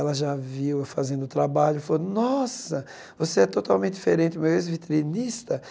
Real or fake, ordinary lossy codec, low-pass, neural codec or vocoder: real; none; none; none